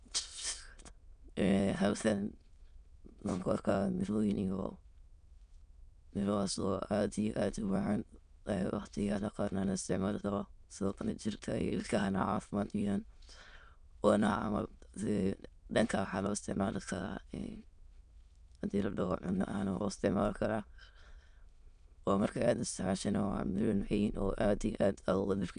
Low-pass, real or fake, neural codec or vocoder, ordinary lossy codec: 9.9 kHz; fake; autoencoder, 22.05 kHz, a latent of 192 numbers a frame, VITS, trained on many speakers; MP3, 96 kbps